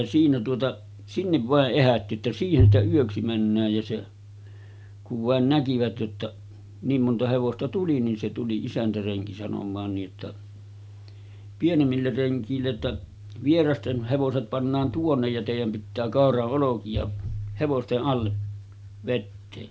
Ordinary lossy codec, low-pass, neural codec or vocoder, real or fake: none; none; none; real